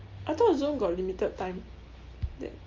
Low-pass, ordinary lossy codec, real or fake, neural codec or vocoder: 7.2 kHz; Opus, 32 kbps; real; none